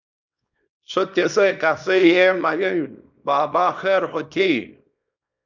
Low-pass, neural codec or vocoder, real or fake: 7.2 kHz; codec, 24 kHz, 0.9 kbps, WavTokenizer, small release; fake